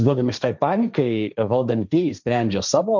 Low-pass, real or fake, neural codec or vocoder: 7.2 kHz; fake; codec, 16 kHz, 1.1 kbps, Voila-Tokenizer